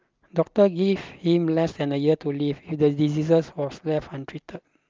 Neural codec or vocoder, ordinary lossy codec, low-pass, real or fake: none; Opus, 32 kbps; 7.2 kHz; real